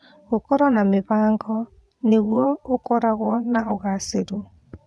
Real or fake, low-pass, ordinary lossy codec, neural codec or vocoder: fake; none; none; vocoder, 22.05 kHz, 80 mel bands, Vocos